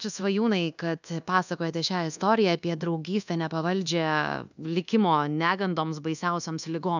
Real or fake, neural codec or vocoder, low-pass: fake; codec, 24 kHz, 1.2 kbps, DualCodec; 7.2 kHz